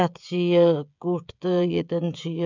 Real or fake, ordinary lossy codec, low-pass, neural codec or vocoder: fake; none; 7.2 kHz; codec, 16 kHz, 16 kbps, FreqCodec, smaller model